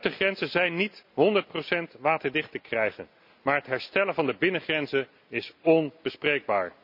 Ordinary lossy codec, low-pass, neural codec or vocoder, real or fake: none; 5.4 kHz; none; real